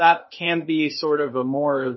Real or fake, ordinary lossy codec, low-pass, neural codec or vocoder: fake; MP3, 24 kbps; 7.2 kHz; codec, 16 kHz, 0.8 kbps, ZipCodec